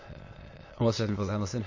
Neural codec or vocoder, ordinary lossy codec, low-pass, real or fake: autoencoder, 22.05 kHz, a latent of 192 numbers a frame, VITS, trained on many speakers; MP3, 32 kbps; 7.2 kHz; fake